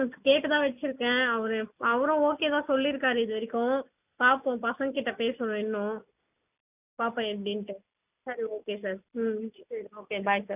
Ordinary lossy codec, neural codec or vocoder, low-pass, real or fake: none; none; 3.6 kHz; real